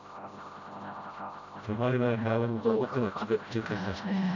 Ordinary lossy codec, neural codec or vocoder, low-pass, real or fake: none; codec, 16 kHz, 0.5 kbps, FreqCodec, smaller model; 7.2 kHz; fake